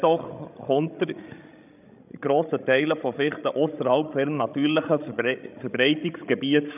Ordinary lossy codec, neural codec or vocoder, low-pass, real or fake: none; codec, 16 kHz, 16 kbps, FreqCodec, larger model; 3.6 kHz; fake